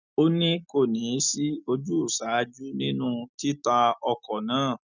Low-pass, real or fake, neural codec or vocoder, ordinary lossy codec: 7.2 kHz; real; none; none